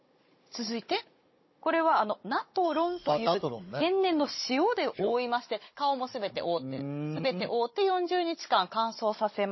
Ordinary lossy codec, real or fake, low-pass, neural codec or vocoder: MP3, 24 kbps; fake; 7.2 kHz; codec, 16 kHz, 16 kbps, FunCodec, trained on Chinese and English, 50 frames a second